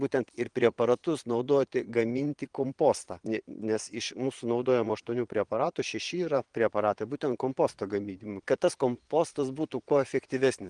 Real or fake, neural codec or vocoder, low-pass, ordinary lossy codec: fake; vocoder, 22.05 kHz, 80 mel bands, Vocos; 9.9 kHz; Opus, 24 kbps